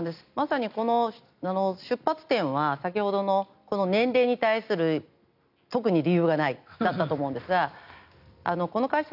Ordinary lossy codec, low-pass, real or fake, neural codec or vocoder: none; 5.4 kHz; real; none